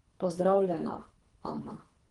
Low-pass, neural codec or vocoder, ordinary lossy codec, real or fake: 10.8 kHz; codec, 24 kHz, 3 kbps, HILCodec; Opus, 24 kbps; fake